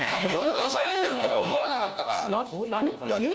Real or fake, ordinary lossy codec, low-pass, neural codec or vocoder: fake; none; none; codec, 16 kHz, 1 kbps, FunCodec, trained on LibriTTS, 50 frames a second